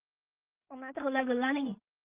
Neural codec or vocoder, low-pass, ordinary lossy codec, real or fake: codec, 16 kHz in and 24 kHz out, 0.4 kbps, LongCat-Audio-Codec, two codebook decoder; 3.6 kHz; Opus, 32 kbps; fake